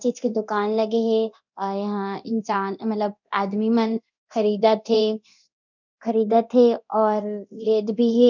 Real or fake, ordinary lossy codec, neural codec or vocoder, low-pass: fake; none; codec, 24 kHz, 0.9 kbps, DualCodec; 7.2 kHz